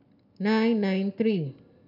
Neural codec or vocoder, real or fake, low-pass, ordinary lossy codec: none; real; 5.4 kHz; none